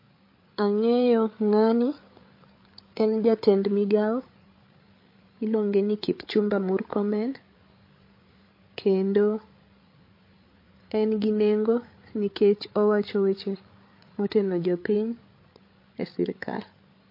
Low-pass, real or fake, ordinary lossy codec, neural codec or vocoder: 5.4 kHz; fake; MP3, 32 kbps; codec, 16 kHz, 8 kbps, FreqCodec, larger model